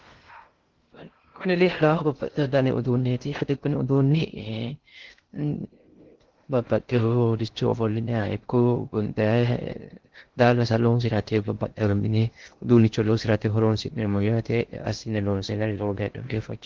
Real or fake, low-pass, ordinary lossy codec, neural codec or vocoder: fake; 7.2 kHz; Opus, 16 kbps; codec, 16 kHz in and 24 kHz out, 0.6 kbps, FocalCodec, streaming, 2048 codes